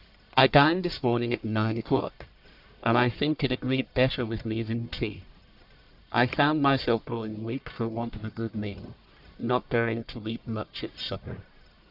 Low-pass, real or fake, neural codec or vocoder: 5.4 kHz; fake; codec, 44.1 kHz, 1.7 kbps, Pupu-Codec